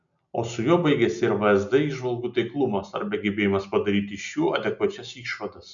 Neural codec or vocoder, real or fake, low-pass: none; real; 7.2 kHz